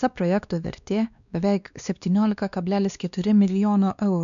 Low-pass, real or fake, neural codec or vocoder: 7.2 kHz; fake; codec, 16 kHz, 2 kbps, X-Codec, WavLM features, trained on Multilingual LibriSpeech